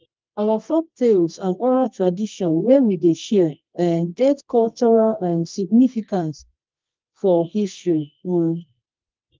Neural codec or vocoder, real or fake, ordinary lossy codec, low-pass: codec, 24 kHz, 0.9 kbps, WavTokenizer, medium music audio release; fake; Opus, 24 kbps; 7.2 kHz